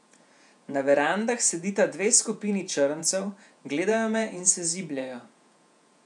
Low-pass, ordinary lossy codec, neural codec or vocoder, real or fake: 10.8 kHz; none; vocoder, 48 kHz, 128 mel bands, Vocos; fake